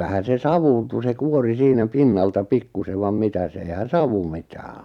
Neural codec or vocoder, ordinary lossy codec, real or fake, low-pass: vocoder, 44.1 kHz, 128 mel bands every 256 samples, BigVGAN v2; none; fake; 19.8 kHz